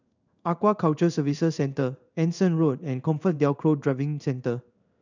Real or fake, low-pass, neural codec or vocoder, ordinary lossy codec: fake; 7.2 kHz; codec, 16 kHz in and 24 kHz out, 1 kbps, XY-Tokenizer; none